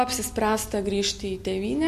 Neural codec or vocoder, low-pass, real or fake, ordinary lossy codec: none; 14.4 kHz; real; MP3, 64 kbps